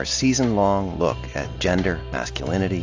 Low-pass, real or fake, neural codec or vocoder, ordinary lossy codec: 7.2 kHz; real; none; AAC, 48 kbps